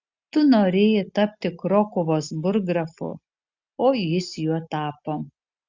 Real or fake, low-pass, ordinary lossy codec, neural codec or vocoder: real; 7.2 kHz; Opus, 64 kbps; none